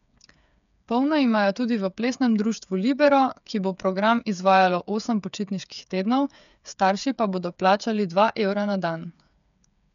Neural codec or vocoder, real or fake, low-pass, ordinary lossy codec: codec, 16 kHz, 8 kbps, FreqCodec, smaller model; fake; 7.2 kHz; none